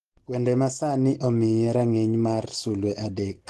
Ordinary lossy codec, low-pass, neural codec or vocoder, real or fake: Opus, 16 kbps; 10.8 kHz; none; real